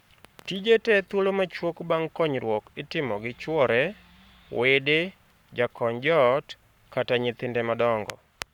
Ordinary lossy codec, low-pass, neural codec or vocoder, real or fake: none; 19.8 kHz; codec, 44.1 kHz, 7.8 kbps, Pupu-Codec; fake